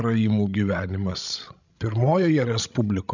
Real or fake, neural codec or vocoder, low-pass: fake; codec, 16 kHz, 16 kbps, FreqCodec, larger model; 7.2 kHz